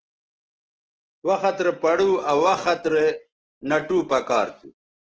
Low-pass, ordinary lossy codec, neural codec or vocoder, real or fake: 7.2 kHz; Opus, 16 kbps; none; real